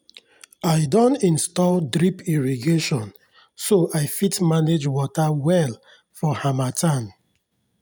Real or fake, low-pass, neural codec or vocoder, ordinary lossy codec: real; none; none; none